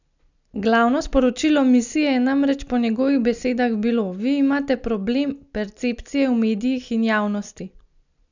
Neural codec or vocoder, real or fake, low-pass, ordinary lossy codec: none; real; 7.2 kHz; none